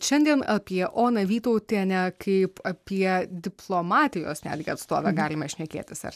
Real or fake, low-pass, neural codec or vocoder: real; 14.4 kHz; none